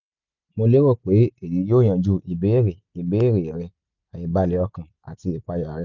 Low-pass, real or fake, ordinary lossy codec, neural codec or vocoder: 7.2 kHz; real; none; none